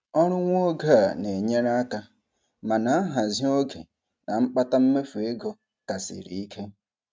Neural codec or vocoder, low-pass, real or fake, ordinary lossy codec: none; none; real; none